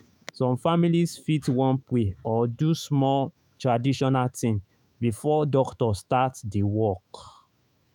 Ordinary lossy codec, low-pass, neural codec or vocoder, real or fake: none; 19.8 kHz; autoencoder, 48 kHz, 128 numbers a frame, DAC-VAE, trained on Japanese speech; fake